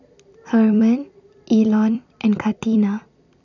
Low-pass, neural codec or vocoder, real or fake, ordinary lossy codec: 7.2 kHz; vocoder, 44.1 kHz, 128 mel bands every 256 samples, BigVGAN v2; fake; none